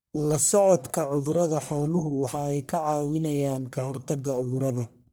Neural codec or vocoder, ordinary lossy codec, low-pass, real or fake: codec, 44.1 kHz, 1.7 kbps, Pupu-Codec; none; none; fake